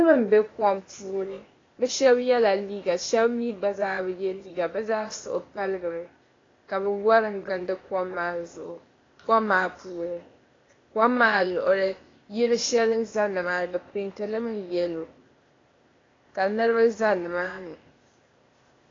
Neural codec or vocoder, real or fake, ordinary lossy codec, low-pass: codec, 16 kHz, 0.8 kbps, ZipCodec; fake; AAC, 32 kbps; 7.2 kHz